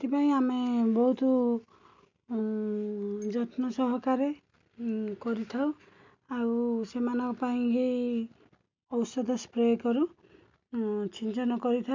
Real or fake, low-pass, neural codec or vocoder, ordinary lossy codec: real; 7.2 kHz; none; MP3, 64 kbps